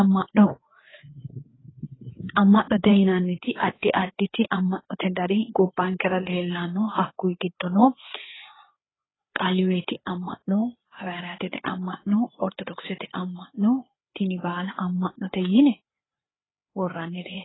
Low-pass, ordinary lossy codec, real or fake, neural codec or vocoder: 7.2 kHz; AAC, 16 kbps; fake; codec, 16 kHz, 4 kbps, FreqCodec, larger model